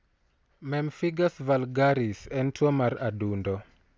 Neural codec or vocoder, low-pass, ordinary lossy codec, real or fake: none; none; none; real